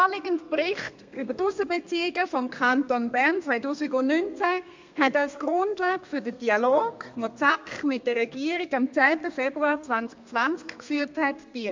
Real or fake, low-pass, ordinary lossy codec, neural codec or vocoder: fake; 7.2 kHz; MP3, 64 kbps; codec, 32 kHz, 1.9 kbps, SNAC